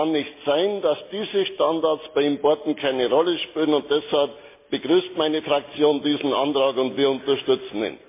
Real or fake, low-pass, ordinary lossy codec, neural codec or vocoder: real; 3.6 kHz; MP3, 24 kbps; none